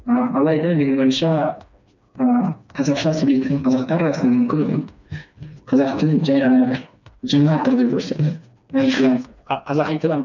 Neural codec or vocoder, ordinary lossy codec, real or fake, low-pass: codec, 16 kHz, 2 kbps, FreqCodec, smaller model; none; fake; 7.2 kHz